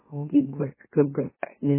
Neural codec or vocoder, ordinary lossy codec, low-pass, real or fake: autoencoder, 44.1 kHz, a latent of 192 numbers a frame, MeloTTS; MP3, 16 kbps; 3.6 kHz; fake